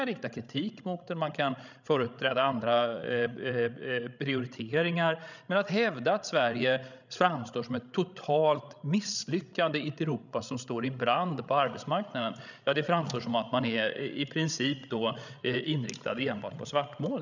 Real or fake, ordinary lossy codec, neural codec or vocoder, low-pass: fake; none; codec, 16 kHz, 16 kbps, FreqCodec, larger model; 7.2 kHz